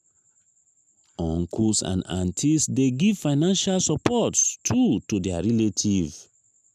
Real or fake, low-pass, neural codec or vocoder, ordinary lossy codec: real; 14.4 kHz; none; none